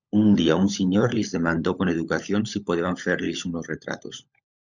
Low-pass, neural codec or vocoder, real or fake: 7.2 kHz; codec, 16 kHz, 16 kbps, FunCodec, trained on LibriTTS, 50 frames a second; fake